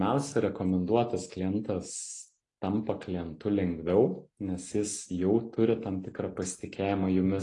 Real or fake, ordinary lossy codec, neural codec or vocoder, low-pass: real; AAC, 32 kbps; none; 10.8 kHz